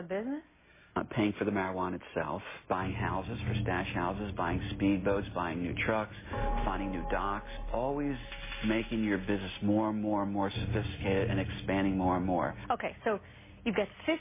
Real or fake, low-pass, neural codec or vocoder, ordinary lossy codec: real; 3.6 kHz; none; MP3, 16 kbps